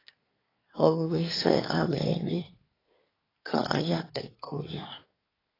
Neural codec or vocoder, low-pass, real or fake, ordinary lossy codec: codec, 24 kHz, 1 kbps, SNAC; 5.4 kHz; fake; AAC, 24 kbps